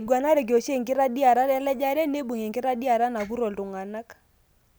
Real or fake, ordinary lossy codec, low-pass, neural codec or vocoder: real; none; none; none